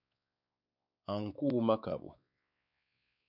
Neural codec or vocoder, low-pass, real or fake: codec, 16 kHz, 4 kbps, X-Codec, WavLM features, trained on Multilingual LibriSpeech; 5.4 kHz; fake